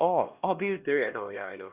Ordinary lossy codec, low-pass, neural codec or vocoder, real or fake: Opus, 64 kbps; 3.6 kHz; codec, 16 kHz, 1 kbps, X-Codec, HuBERT features, trained on LibriSpeech; fake